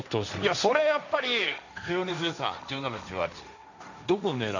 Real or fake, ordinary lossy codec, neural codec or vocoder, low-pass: fake; none; codec, 16 kHz, 1.1 kbps, Voila-Tokenizer; 7.2 kHz